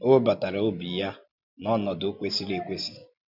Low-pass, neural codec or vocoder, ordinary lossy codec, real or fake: 5.4 kHz; none; none; real